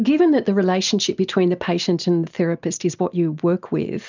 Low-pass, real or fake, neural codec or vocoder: 7.2 kHz; real; none